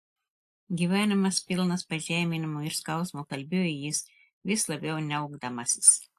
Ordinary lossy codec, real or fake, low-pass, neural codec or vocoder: AAC, 64 kbps; real; 14.4 kHz; none